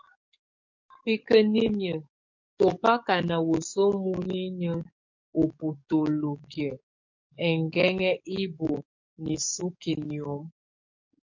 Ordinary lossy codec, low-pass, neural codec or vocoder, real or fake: MP3, 48 kbps; 7.2 kHz; codec, 44.1 kHz, 7.8 kbps, DAC; fake